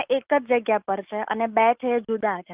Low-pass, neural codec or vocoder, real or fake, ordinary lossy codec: 3.6 kHz; none; real; Opus, 24 kbps